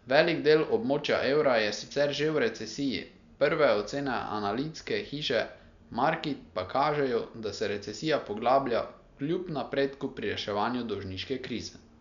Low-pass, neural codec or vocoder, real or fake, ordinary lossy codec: 7.2 kHz; none; real; none